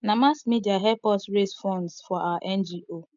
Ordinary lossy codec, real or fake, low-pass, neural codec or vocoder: MP3, 64 kbps; real; 7.2 kHz; none